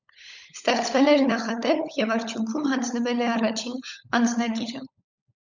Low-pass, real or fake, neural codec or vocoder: 7.2 kHz; fake; codec, 16 kHz, 16 kbps, FunCodec, trained on LibriTTS, 50 frames a second